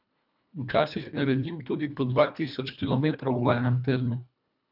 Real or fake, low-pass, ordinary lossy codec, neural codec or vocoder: fake; 5.4 kHz; none; codec, 24 kHz, 1.5 kbps, HILCodec